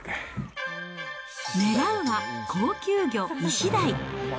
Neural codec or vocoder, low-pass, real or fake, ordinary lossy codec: none; none; real; none